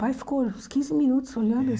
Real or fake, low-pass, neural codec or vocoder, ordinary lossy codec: real; none; none; none